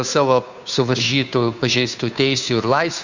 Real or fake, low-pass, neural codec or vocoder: fake; 7.2 kHz; codec, 16 kHz, 2 kbps, FunCodec, trained on Chinese and English, 25 frames a second